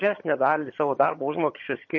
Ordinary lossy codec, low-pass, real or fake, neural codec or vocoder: MP3, 32 kbps; 7.2 kHz; fake; vocoder, 22.05 kHz, 80 mel bands, HiFi-GAN